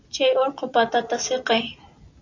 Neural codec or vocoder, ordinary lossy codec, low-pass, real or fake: none; AAC, 48 kbps; 7.2 kHz; real